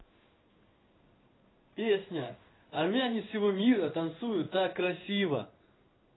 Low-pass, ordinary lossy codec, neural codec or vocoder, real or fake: 7.2 kHz; AAC, 16 kbps; codec, 16 kHz in and 24 kHz out, 1 kbps, XY-Tokenizer; fake